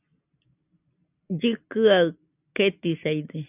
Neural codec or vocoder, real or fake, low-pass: none; real; 3.6 kHz